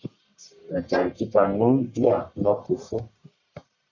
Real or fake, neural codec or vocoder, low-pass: fake; codec, 44.1 kHz, 1.7 kbps, Pupu-Codec; 7.2 kHz